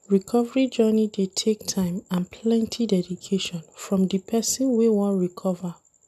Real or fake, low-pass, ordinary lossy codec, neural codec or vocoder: real; 14.4 kHz; MP3, 96 kbps; none